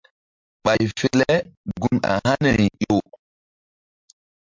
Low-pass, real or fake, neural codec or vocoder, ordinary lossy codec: 7.2 kHz; real; none; MP3, 64 kbps